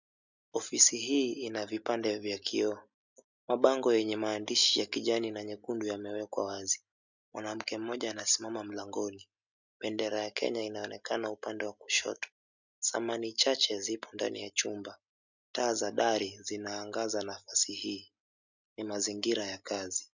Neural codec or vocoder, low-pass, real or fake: none; 7.2 kHz; real